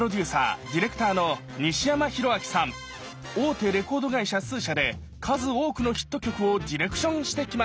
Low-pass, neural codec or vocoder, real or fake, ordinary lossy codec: none; none; real; none